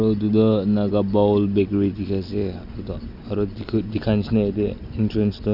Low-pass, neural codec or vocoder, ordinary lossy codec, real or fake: 5.4 kHz; none; none; real